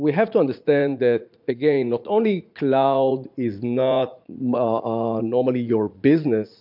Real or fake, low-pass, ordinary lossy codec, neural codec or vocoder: fake; 5.4 kHz; MP3, 48 kbps; vocoder, 44.1 kHz, 80 mel bands, Vocos